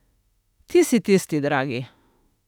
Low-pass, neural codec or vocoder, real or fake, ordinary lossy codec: 19.8 kHz; autoencoder, 48 kHz, 32 numbers a frame, DAC-VAE, trained on Japanese speech; fake; none